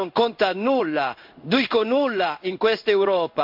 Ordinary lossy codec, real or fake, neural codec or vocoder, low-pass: MP3, 48 kbps; fake; codec, 16 kHz in and 24 kHz out, 1 kbps, XY-Tokenizer; 5.4 kHz